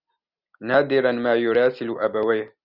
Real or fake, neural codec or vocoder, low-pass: real; none; 5.4 kHz